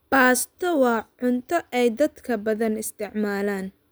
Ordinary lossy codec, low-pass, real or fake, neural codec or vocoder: none; none; real; none